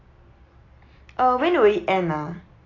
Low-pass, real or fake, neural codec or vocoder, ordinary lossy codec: 7.2 kHz; real; none; AAC, 32 kbps